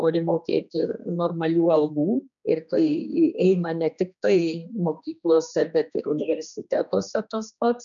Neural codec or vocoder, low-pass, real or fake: codec, 16 kHz, 2 kbps, X-Codec, HuBERT features, trained on general audio; 7.2 kHz; fake